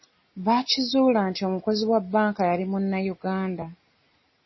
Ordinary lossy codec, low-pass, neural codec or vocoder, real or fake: MP3, 24 kbps; 7.2 kHz; none; real